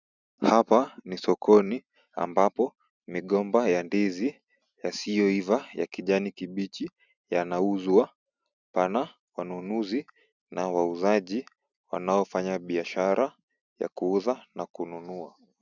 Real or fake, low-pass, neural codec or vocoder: real; 7.2 kHz; none